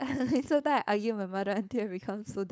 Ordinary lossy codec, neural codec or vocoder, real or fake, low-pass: none; codec, 16 kHz, 4.8 kbps, FACodec; fake; none